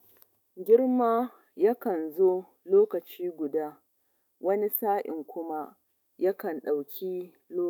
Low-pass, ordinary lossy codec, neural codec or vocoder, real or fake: 19.8 kHz; none; autoencoder, 48 kHz, 128 numbers a frame, DAC-VAE, trained on Japanese speech; fake